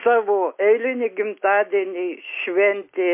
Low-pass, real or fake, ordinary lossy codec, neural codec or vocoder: 3.6 kHz; real; MP3, 24 kbps; none